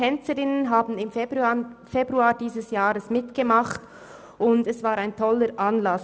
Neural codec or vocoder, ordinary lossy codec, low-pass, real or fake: none; none; none; real